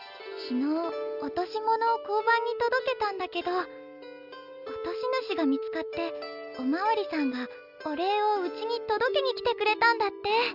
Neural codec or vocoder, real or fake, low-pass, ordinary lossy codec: none; real; 5.4 kHz; none